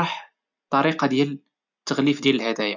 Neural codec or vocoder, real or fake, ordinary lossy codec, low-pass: none; real; none; 7.2 kHz